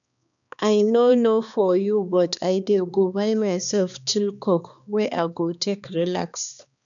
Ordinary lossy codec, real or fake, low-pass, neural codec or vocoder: none; fake; 7.2 kHz; codec, 16 kHz, 2 kbps, X-Codec, HuBERT features, trained on balanced general audio